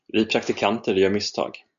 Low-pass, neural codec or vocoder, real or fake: 7.2 kHz; none; real